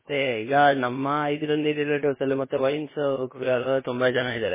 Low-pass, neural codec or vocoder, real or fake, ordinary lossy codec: 3.6 kHz; codec, 16 kHz, about 1 kbps, DyCAST, with the encoder's durations; fake; MP3, 16 kbps